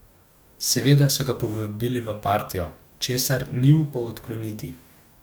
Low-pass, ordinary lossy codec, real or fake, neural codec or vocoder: none; none; fake; codec, 44.1 kHz, 2.6 kbps, DAC